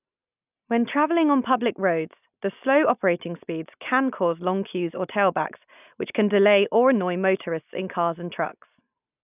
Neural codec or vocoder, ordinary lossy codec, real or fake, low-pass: none; none; real; 3.6 kHz